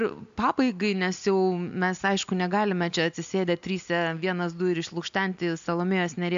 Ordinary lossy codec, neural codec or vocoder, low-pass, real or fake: AAC, 64 kbps; none; 7.2 kHz; real